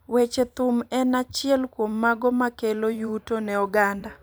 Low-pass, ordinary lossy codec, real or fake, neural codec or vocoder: none; none; fake; vocoder, 44.1 kHz, 128 mel bands every 512 samples, BigVGAN v2